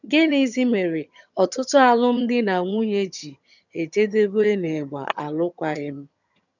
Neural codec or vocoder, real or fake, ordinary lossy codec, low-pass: vocoder, 22.05 kHz, 80 mel bands, HiFi-GAN; fake; none; 7.2 kHz